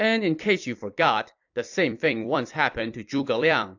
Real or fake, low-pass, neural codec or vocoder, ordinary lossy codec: fake; 7.2 kHz; vocoder, 44.1 kHz, 128 mel bands every 256 samples, BigVGAN v2; AAC, 48 kbps